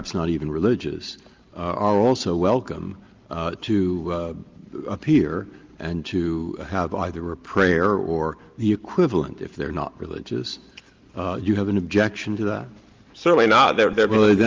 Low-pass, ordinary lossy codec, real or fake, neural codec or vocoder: 7.2 kHz; Opus, 24 kbps; real; none